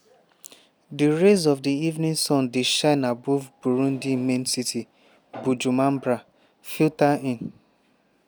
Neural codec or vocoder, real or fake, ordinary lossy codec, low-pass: none; real; none; none